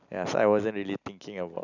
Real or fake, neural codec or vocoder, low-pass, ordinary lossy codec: real; none; 7.2 kHz; none